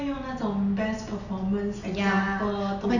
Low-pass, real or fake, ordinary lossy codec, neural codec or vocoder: 7.2 kHz; real; none; none